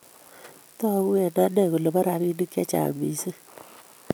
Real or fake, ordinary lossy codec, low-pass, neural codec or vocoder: real; none; none; none